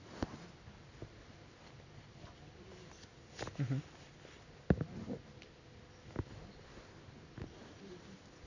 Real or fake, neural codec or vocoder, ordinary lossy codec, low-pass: real; none; none; 7.2 kHz